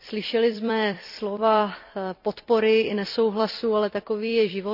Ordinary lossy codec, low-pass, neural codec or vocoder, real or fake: none; 5.4 kHz; vocoder, 44.1 kHz, 128 mel bands every 256 samples, BigVGAN v2; fake